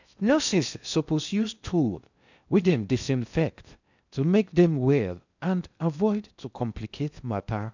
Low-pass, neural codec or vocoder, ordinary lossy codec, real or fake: 7.2 kHz; codec, 16 kHz in and 24 kHz out, 0.6 kbps, FocalCodec, streaming, 2048 codes; none; fake